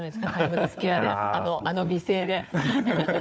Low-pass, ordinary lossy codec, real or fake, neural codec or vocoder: none; none; fake; codec, 16 kHz, 4 kbps, FunCodec, trained on Chinese and English, 50 frames a second